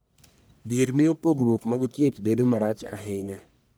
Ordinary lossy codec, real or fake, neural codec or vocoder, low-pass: none; fake; codec, 44.1 kHz, 1.7 kbps, Pupu-Codec; none